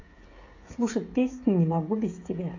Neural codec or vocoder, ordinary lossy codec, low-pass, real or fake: codec, 16 kHz, 16 kbps, FreqCodec, smaller model; AAC, 32 kbps; 7.2 kHz; fake